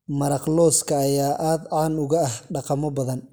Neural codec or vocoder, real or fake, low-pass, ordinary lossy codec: none; real; none; none